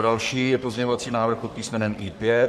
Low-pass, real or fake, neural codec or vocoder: 14.4 kHz; fake; codec, 44.1 kHz, 3.4 kbps, Pupu-Codec